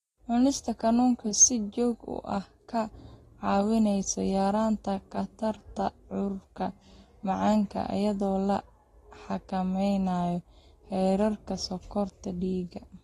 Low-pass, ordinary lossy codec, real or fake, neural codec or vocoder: 19.8 kHz; AAC, 32 kbps; real; none